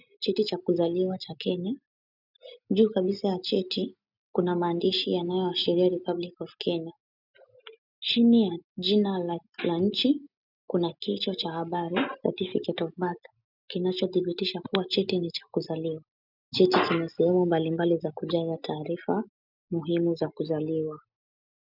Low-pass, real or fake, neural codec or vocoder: 5.4 kHz; real; none